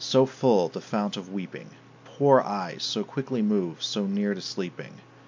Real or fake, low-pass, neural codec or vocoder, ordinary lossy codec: real; 7.2 kHz; none; MP3, 64 kbps